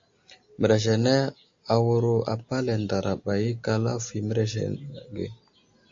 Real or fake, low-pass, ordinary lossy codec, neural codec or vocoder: real; 7.2 kHz; AAC, 48 kbps; none